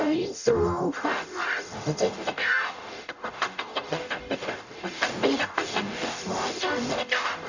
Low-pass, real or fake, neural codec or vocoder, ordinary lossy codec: 7.2 kHz; fake; codec, 44.1 kHz, 0.9 kbps, DAC; none